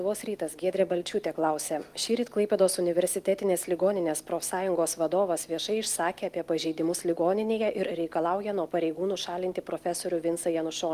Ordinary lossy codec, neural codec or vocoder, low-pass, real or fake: Opus, 24 kbps; none; 14.4 kHz; real